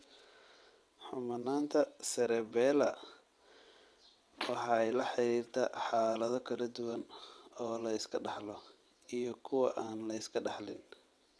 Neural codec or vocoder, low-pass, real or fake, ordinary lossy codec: vocoder, 22.05 kHz, 80 mel bands, WaveNeXt; none; fake; none